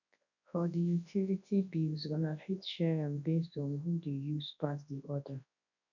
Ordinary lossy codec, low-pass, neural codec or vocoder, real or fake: none; 7.2 kHz; codec, 24 kHz, 0.9 kbps, WavTokenizer, large speech release; fake